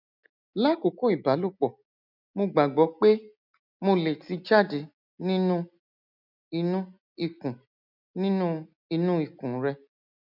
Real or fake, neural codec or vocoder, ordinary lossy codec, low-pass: real; none; none; 5.4 kHz